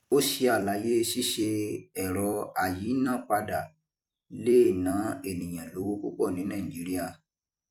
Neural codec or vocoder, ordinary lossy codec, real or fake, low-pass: vocoder, 44.1 kHz, 128 mel bands every 256 samples, BigVGAN v2; none; fake; 19.8 kHz